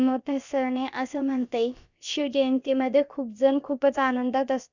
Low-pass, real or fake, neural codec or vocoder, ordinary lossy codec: 7.2 kHz; fake; codec, 16 kHz, about 1 kbps, DyCAST, with the encoder's durations; none